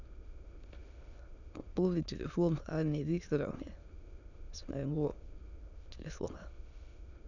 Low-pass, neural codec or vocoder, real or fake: 7.2 kHz; autoencoder, 22.05 kHz, a latent of 192 numbers a frame, VITS, trained on many speakers; fake